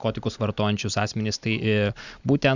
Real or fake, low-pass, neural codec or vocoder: real; 7.2 kHz; none